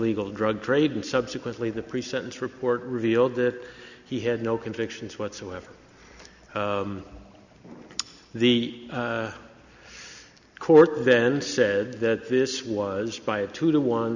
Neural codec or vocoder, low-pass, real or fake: none; 7.2 kHz; real